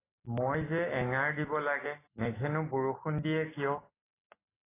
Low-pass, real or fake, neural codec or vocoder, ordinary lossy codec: 3.6 kHz; real; none; AAC, 16 kbps